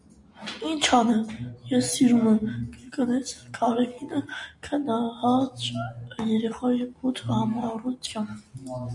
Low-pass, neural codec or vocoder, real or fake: 10.8 kHz; none; real